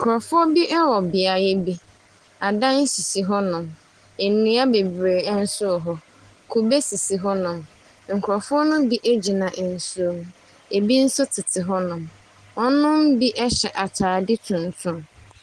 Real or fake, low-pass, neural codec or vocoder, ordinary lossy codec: real; 10.8 kHz; none; Opus, 16 kbps